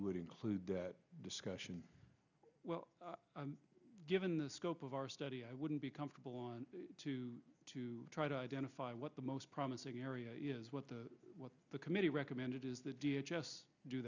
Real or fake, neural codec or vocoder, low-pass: real; none; 7.2 kHz